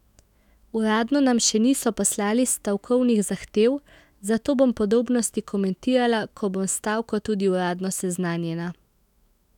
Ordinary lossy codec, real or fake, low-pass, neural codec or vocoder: none; fake; 19.8 kHz; autoencoder, 48 kHz, 128 numbers a frame, DAC-VAE, trained on Japanese speech